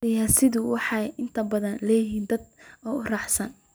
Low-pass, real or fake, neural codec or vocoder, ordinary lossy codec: none; real; none; none